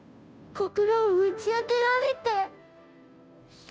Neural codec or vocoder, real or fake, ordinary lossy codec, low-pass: codec, 16 kHz, 0.5 kbps, FunCodec, trained on Chinese and English, 25 frames a second; fake; none; none